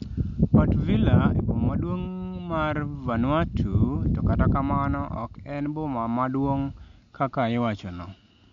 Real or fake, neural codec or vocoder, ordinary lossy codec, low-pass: real; none; MP3, 64 kbps; 7.2 kHz